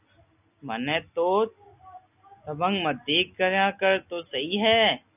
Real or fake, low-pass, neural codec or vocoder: real; 3.6 kHz; none